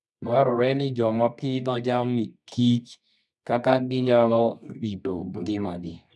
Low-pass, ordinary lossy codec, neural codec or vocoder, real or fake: none; none; codec, 24 kHz, 0.9 kbps, WavTokenizer, medium music audio release; fake